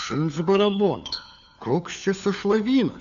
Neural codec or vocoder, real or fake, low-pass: codec, 16 kHz, 4 kbps, FunCodec, trained on LibriTTS, 50 frames a second; fake; 7.2 kHz